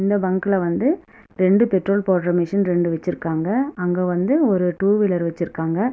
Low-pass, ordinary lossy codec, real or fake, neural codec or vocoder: none; none; real; none